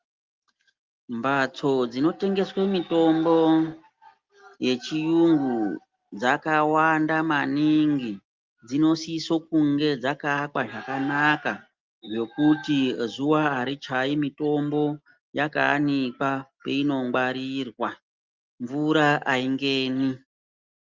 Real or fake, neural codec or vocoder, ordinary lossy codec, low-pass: real; none; Opus, 32 kbps; 7.2 kHz